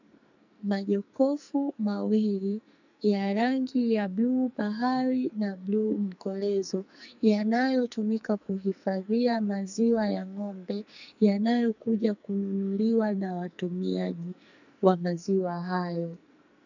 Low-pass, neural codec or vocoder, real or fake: 7.2 kHz; codec, 44.1 kHz, 2.6 kbps, SNAC; fake